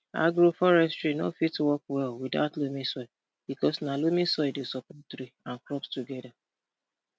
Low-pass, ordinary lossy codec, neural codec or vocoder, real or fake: none; none; none; real